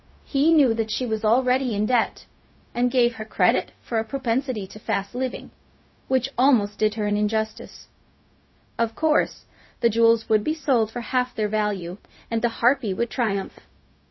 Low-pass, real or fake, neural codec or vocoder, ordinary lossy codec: 7.2 kHz; fake; codec, 16 kHz, 0.4 kbps, LongCat-Audio-Codec; MP3, 24 kbps